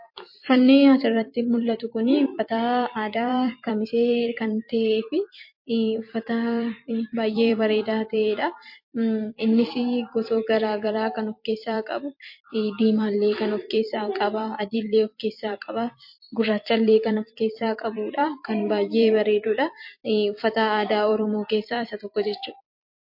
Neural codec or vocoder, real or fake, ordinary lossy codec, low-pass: vocoder, 44.1 kHz, 128 mel bands every 256 samples, BigVGAN v2; fake; MP3, 32 kbps; 5.4 kHz